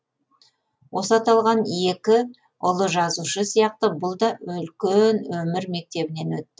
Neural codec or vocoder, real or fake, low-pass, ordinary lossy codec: none; real; none; none